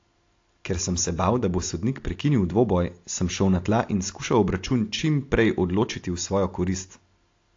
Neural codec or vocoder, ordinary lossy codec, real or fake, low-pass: none; AAC, 48 kbps; real; 7.2 kHz